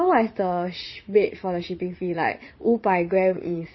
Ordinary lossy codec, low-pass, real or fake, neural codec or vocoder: MP3, 24 kbps; 7.2 kHz; fake; vocoder, 44.1 kHz, 80 mel bands, Vocos